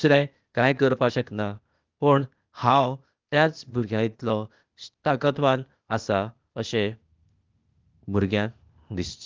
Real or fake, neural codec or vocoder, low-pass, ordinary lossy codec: fake; codec, 16 kHz, 0.8 kbps, ZipCodec; 7.2 kHz; Opus, 32 kbps